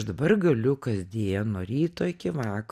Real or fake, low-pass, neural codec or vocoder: real; 14.4 kHz; none